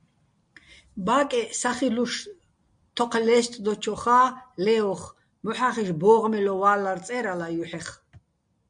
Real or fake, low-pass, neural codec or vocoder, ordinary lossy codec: real; 9.9 kHz; none; MP3, 64 kbps